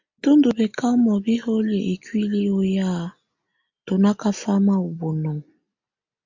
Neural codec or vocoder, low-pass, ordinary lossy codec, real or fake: none; 7.2 kHz; MP3, 48 kbps; real